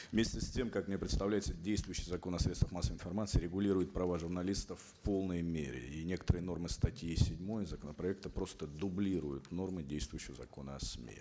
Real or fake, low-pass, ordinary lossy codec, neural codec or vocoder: real; none; none; none